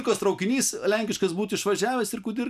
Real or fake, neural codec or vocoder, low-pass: real; none; 14.4 kHz